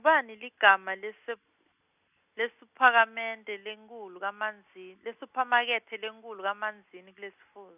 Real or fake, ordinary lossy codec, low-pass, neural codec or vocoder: real; none; 3.6 kHz; none